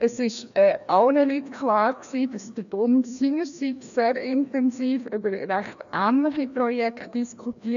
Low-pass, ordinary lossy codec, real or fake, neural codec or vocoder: 7.2 kHz; none; fake; codec, 16 kHz, 1 kbps, FreqCodec, larger model